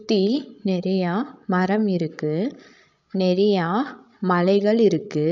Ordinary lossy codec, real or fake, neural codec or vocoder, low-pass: none; fake; codec, 16 kHz, 16 kbps, FreqCodec, larger model; 7.2 kHz